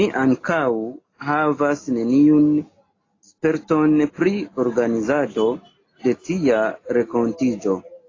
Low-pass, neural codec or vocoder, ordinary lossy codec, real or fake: 7.2 kHz; none; AAC, 32 kbps; real